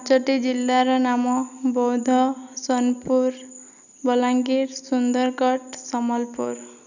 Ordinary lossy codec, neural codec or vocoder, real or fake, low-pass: none; none; real; 7.2 kHz